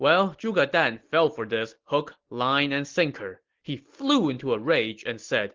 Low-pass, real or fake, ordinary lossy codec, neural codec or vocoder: 7.2 kHz; real; Opus, 16 kbps; none